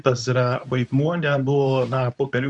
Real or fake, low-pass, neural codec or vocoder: fake; 10.8 kHz; codec, 24 kHz, 0.9 kbps, WavTokenizer, medium speech release version 2